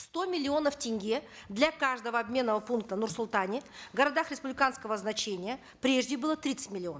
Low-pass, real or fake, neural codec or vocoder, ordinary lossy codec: none; real; none; none